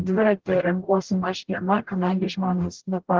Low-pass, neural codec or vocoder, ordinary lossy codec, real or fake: 7.2 kHz; codec, 44.1 kHz, 0.9 kbps, DAC; Opus, 16 kbps; fake